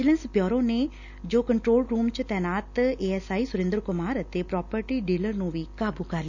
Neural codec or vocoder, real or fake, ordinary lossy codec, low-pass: none; real; none; 7.2 kHz